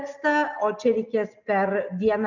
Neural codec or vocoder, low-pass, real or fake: none; 7.2 kHz; real